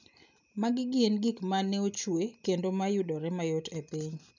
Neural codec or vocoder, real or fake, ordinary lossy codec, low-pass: none; real; none; 7.2 kHz